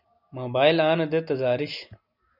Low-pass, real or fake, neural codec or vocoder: 5.4 kHz; real; none